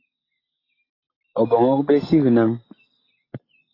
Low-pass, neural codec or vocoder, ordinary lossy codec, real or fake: 5.4 kHz; none; AAC, 24 kbps; real